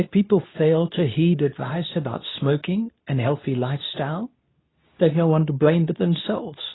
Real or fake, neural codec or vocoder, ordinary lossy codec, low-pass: fake; codec, 24 kHz, 0.9 kbps, WavTokenizer, medium speech release version 2; AAC, 16 kbps; 7.2 kHz